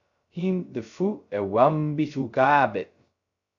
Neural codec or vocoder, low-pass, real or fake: codec, 16 kHz, 0.2 kbps, FocalCodec; 7.2 kHz; fake